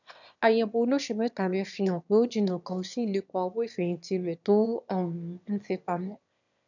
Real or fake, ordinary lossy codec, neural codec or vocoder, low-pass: fake; none; autoencoder, 22.05 kHz, a latent of 192 numbers a frame, VITS, trained on one speaker; 7.2 kHz